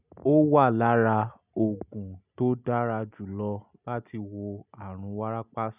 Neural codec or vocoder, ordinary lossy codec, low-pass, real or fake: none; none; 3.6 kHz; real